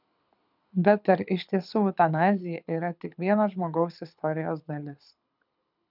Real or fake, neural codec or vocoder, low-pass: fake; codec, 24 kHz, 6 kbps, HILCodec; 5.4 kHz